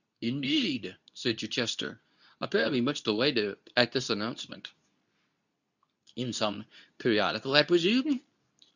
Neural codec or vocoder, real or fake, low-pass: codec, 24 kHz, 0.9 kbps, WavTokenizer, medium speech release version 2; fake; 7.2 kHz